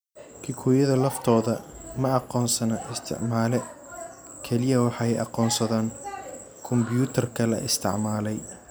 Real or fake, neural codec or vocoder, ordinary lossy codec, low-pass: real; none; none; none